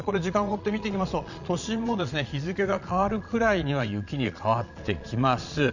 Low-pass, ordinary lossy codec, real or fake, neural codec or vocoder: 7.2 kHz; none; fake; vocoder, 22.05 kHz, 80 mel bands, Vocos